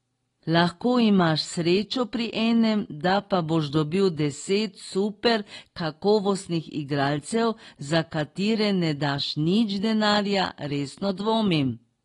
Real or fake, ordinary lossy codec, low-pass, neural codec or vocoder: real; AAC, 32 kbps; 10.8 kHz; none